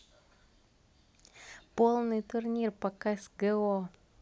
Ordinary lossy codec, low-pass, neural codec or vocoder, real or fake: none; none; none; real